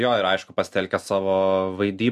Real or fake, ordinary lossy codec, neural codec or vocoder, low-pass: real; MP3, 64 kbps; none; 14.4 kHz